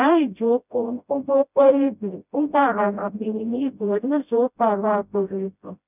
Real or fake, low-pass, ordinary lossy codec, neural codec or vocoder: fake; 3.6 kHz; none; codec, 16 kHz, 0.5 kbps, FreqCodec, smaller model